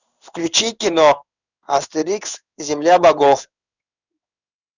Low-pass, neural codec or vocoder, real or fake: 7.2 kHz; codec, 16 kHz in and 24 kHz out, 1 kbps, XY-Tokenizer; fake